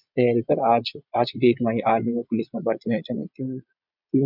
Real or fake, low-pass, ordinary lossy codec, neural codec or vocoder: fake; 5.4 kHz; none; vocoder, 22.05 kHz, 80 mel bands, Vocos